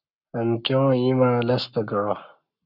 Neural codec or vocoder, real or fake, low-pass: codec, 44.1 kHz, 7.8 kbps, Pupu-Codec; fake; 5.4 kHz